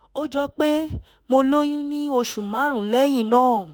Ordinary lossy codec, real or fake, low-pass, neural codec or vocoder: none; fake; none; autoencoder, 48 kHz, 32 numbers a frame, DAC-VAE, trained on Japanese speech